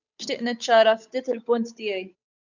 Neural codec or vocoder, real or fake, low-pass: codec, 16 kHz, 8 kbps, FunCodec, trained on Chinese and English, 25 frames a second; fake; 7.2 kHz